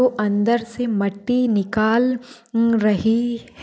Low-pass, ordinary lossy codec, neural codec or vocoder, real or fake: none; none; none; real